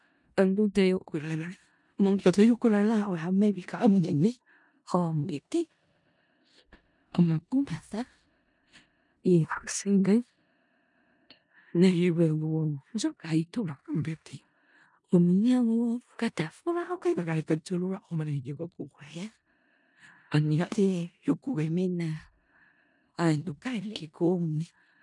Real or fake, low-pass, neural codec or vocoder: fake; 10.8 kHz; codec, 16 kHz in and 24 kHz out, 0.4 kbps, LongCat-Audio-Codec, four codebook decoder